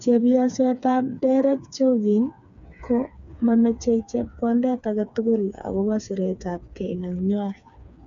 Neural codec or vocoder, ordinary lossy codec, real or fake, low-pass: codec, 16 kHz, 4 kbps, FreqCodec, smaller model; none; fake; 7.2 kHz